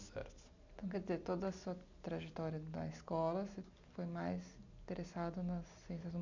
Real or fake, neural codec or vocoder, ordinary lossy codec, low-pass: real; none; MP3, 64 kbps; 7.2 kHz